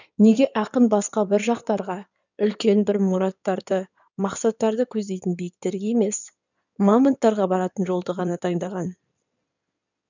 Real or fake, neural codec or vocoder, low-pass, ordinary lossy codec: fake; codec, 16 kHz in and 24 kHz out, 2.2 kbps, FireRedTTS-2 codec; 7.2 kHz; none